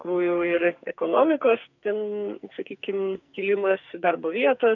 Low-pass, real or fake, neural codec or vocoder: 7.2 kHz; fake; codec, 44.1 kHz, 2.6 kbps, SNAC